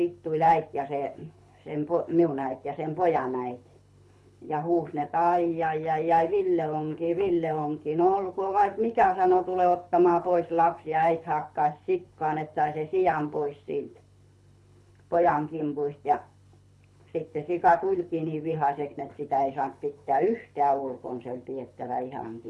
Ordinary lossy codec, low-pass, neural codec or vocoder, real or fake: none; none; codec, 24 kHz, 6 kbps, HILCodec; fake